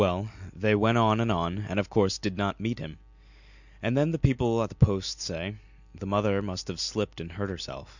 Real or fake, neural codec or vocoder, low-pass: real; none; 7.2 kHz